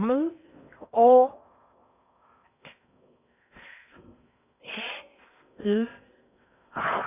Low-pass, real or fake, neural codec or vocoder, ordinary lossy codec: 3.6 kHz; fake; codec, 16 kHz in and 24 kHz out, 0.6 kbps, FocalCodec, streaming, 4096 codes; none